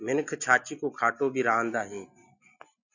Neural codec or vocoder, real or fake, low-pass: none; real; 7.2 kHz